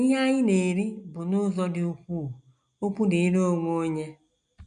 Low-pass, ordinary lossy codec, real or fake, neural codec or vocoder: 9.9 kHz; none; real; none